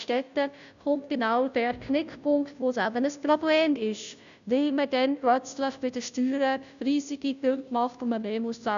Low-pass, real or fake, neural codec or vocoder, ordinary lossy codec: 7.2 kHz; fake; codec, 16 kHz, 0.5 kbps, FunCodec, trained on Chinese and English, 25 frames a second; none